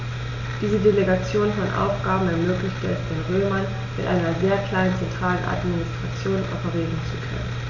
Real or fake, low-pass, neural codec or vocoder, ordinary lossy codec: real; 7.2 kHz; none; none